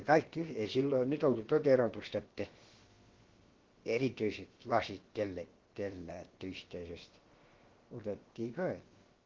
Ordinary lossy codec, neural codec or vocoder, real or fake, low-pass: Opus, 16 kbps; codec, 16 kHz, about 1 kbps, DyCAST, with the encoder's durations; fake; 7.2 kHz